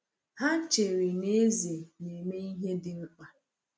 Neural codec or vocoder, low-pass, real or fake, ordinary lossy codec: none; none; real; none